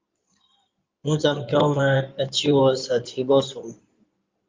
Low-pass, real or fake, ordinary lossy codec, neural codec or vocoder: 7.2 kHz; fake; Opus, 24 kbps; codec, 16 kHz in and 24 kHz out, 2.2 kbps, FireRedTTS-2 codec